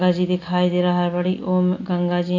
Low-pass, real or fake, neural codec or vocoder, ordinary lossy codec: 7.2 kHz; real; none; AAC, 32 kbps